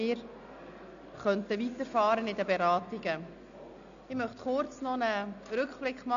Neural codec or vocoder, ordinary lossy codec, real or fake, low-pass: none; none; real; 7.2 kHz